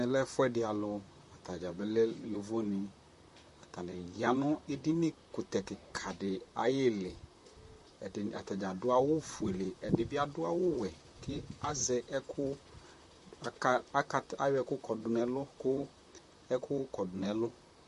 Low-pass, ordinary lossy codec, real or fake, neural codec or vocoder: 14.4 kHz; MP3, 48 kbps; fake; vocoder, 44.1 kHz, 128 mel bands, Pupu-Vocoder